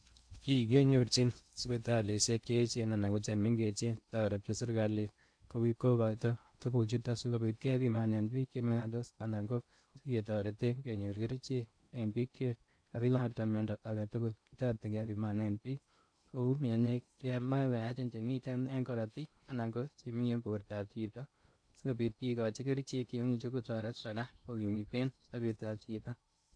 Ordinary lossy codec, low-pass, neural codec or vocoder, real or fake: AAC, 64 kbps; 9.9 kHz; codec, 16 kHz in and 24 kHz out, 0.6 kbps, FocalCodec, streaming, 2048 codes; fake